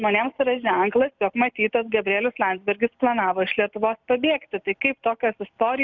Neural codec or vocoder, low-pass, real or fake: none; 7.2 kHz; real